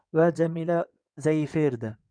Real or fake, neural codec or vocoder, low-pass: fake; codec, 16 kHz in and 24 kHz out, 2.2 kbps, FireRedTTS-2 codec; 9.9 kHz